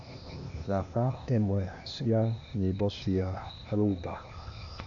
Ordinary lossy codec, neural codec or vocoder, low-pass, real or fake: none; codec, 16 kHz, 0.8 kbps, ZipCodec; 7.2 kHz; fake